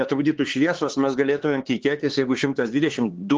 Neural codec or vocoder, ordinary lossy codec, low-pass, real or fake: codec, 16 kHz, 4 kbps, X-Codec, HuBERT features, trained on LibriSpeech; Opus, 16 kbps; 7.2 kHz; fake